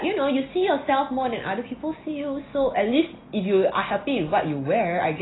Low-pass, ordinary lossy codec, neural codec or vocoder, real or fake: 7.2 kHz; AAC, 16 kbps; none; real